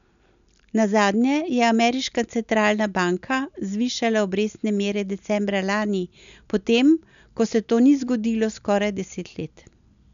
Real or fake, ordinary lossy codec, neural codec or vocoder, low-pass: real; MP3, 96 kbps; none; 7.2 kHz